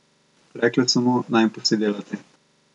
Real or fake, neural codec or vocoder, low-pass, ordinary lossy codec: real; none; 10.8 kHz; none